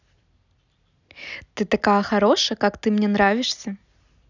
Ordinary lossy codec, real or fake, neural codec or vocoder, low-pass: none; real; none; 7.2 kHz